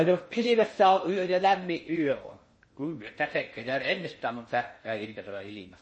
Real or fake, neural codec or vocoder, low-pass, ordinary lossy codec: fake; codec, 16 kHz in and 24 kHz out, 0.6 kbps, FocalCodec, streaming, 4096 codes; 9.9 kHz; MP3, 32 kbps